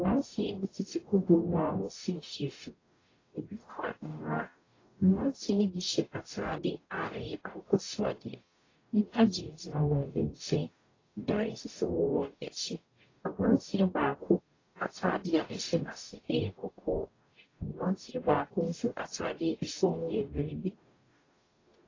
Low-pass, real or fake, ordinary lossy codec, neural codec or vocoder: 7.2 kHz; fake; AAC, 32 kbps; codec, 44.1 kHz, 0.9 kbps, DAC